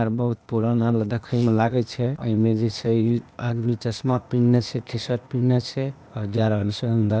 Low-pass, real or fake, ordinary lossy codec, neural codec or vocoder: none; fake; none; codec, 16 kHz, 0.8 kbps, ZipCodec